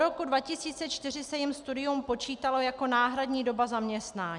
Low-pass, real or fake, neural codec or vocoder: 10.8 kHz; real; none